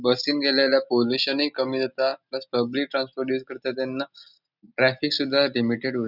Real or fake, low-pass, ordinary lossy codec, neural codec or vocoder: real; 5.4 kHz; none; none